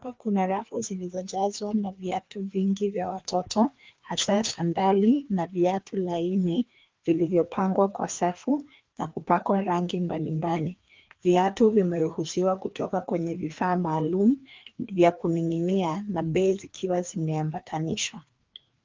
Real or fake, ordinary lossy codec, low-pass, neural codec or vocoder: fake; Opus, 32 kbps; 7.2 kHz; codec, 16 kHz, 2 kbps, FreqCodec, larger model